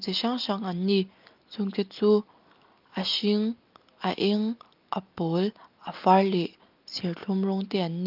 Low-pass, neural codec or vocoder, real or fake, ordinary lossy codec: 5.4 kHz; none; real; Opus, 24 kbps